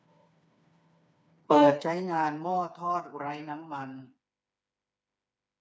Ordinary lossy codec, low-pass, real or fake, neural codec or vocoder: none; none; fake; codec, 16 kHz, 4 kbps, FreqCodec, smaller model